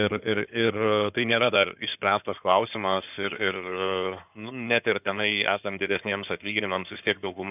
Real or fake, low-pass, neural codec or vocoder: fake; 3.6 kHz; codec, 16 kHz in and 24 kHz out, 2.2 kbps, FireRedTTS-2 codec